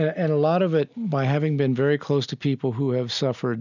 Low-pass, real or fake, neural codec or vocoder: 7.2 kHz; real; none